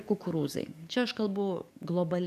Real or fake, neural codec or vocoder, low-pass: fake; codec, 44.1 kHz, 7.8 kbps, DAC; 14.4 kHz